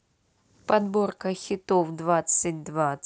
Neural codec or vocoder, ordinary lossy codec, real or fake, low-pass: none; none; real; none